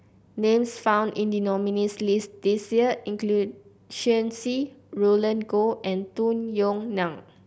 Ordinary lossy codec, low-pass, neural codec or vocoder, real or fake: none; none; none; real